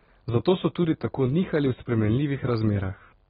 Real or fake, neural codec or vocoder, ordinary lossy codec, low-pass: real; none; AAC, 16 kbps; 19.8 kHz